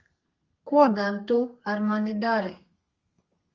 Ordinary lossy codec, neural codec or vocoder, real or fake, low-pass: Opus, 32 kbps; codec, 32 kHz, 1.9 kbps, SNAC; fake; 7.2 kHz